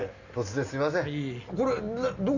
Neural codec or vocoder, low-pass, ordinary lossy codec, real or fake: none; 7.2 kHz; none; real